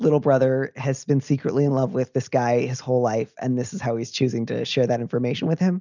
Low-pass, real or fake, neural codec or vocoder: 7.2 kHz; real; none